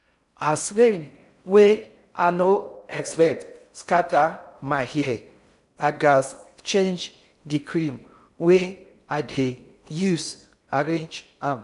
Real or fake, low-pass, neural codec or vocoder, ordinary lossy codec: fake; 10.8 kHz; codec, 16 kHz in and 24 kHz out, 0.6 kbps, FocalCodec, streaming, 4096 codes; none